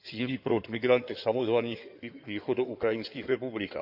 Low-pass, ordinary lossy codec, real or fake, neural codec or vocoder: 5.4 kHz; none; fake; codec, 16 kHz in and 24 kHz out, 2.2 kbps, FireRedTTS-2 codec